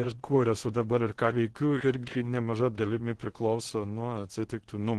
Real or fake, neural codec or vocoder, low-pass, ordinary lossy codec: fake; codec, 16 kHz in and 24 kHz out, 0.8 kbps, FocalCodec, streaming, 65536 codes; 10.8 kHz; Opus, 16 kbps